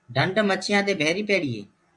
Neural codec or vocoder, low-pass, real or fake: vocoder, 24 kHz, 100 mel bands, Vocos; 10.8 kHz; fake